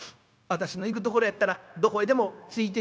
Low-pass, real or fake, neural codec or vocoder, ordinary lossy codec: none; fake; codec, 16 kHz, 0.9 kbps, LongCat-Audio-Codec; none